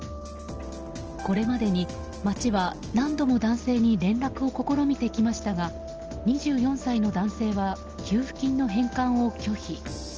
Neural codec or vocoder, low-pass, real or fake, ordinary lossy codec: none; 7.2 kHz; real; Opus, 16 kbps